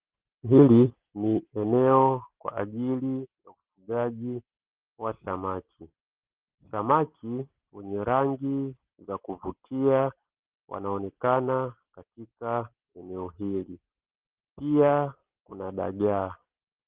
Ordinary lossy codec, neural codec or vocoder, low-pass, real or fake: Opus, 16 kbps; none; 3.6 kHz; real